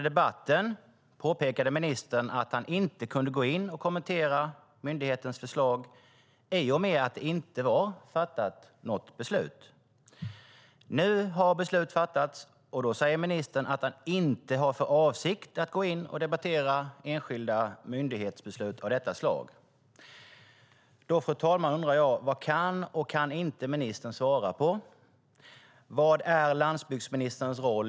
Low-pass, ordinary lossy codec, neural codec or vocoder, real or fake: none; none; none; real